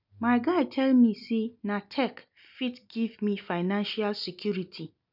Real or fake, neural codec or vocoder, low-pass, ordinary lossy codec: real; none; 5.4 kHz; none